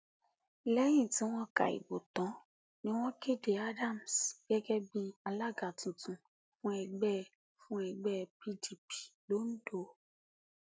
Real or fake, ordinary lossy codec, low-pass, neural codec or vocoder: real; none; none; none